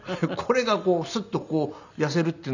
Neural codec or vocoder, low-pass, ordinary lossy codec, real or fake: none; 7.2 kHz; none; real